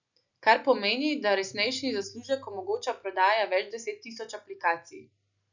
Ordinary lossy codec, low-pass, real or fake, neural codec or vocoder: none; 7.2 kHz; real; none